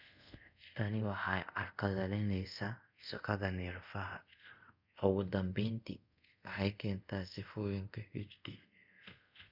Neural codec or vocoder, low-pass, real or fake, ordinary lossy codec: codec, 24 kHz, 0.5 kbps, DualCodec; 5.4 kHz; fake; none